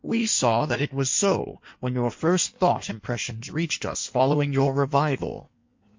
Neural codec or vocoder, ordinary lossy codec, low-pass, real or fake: codec, 16 kHz in and 24 kHz out, 1.1 kbps, FireRedTTS-2 codec; MP3, 48 kbps; 7.2 kHz; fake